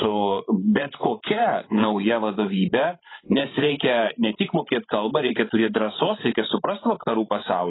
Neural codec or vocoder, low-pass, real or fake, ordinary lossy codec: codec, 16 kHz, 16 kbps, FreqCodec, larger model; 7.2 kHz; fake; AAC, 16 kbps